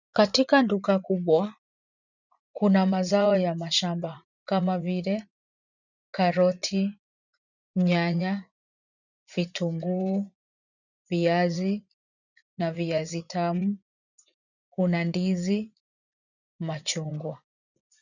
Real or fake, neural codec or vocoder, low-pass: fake; vocoder, 44.1 kHz, 80 mel bands, Vocos; 7.2 kHz